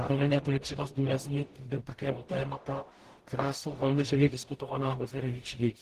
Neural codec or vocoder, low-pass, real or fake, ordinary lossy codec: codec, 44.1 kHz, 0.9 kbps, DAC; 14.4 kHz; fake; Opus, 16 kbps